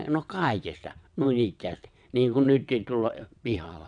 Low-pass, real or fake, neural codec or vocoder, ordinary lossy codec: 9.9 kHz; fake; vocoder, 22.05 kHz, 80 mel bands, WaveNeXt; none